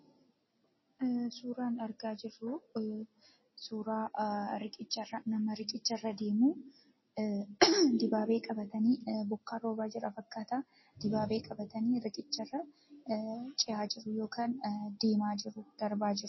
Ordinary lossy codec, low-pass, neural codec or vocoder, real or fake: MP3, 24 kbps; 7.2 kHz; none; real